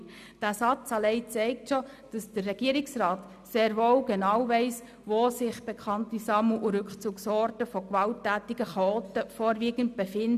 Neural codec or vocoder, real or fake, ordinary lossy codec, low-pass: none; real; none; 14.4 kHz